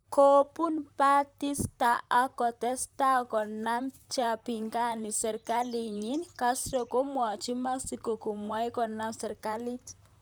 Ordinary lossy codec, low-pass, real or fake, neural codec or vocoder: none; none; fake; vocoder, 44.1 kHz, 128 mel bands, Pupu-Vocoder